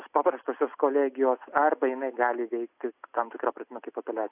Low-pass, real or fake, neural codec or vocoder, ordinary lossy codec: 3.6 kHz; real; none; AAC, 32 kbps